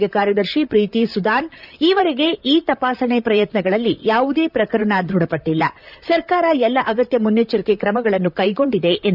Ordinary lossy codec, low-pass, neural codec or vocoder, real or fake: none; 5.4 kHz; vocoder, 44.1 kHz, 128 mel bands, Pupu-Vocoder; fake